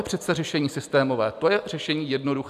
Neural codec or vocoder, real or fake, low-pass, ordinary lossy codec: none; real; 14.4 kHz; MP3, 96 kbps